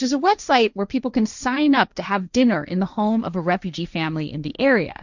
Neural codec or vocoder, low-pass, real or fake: codec, 16 kHz, 1.1 kbps, Voila-Tokenizer; 7.2 kHz; fake